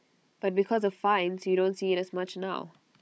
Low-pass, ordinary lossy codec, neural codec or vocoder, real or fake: none; none; codec, 16 kHz, 16 kbps, FunCodec, trained on Chinese and English, 50 frames a second; fake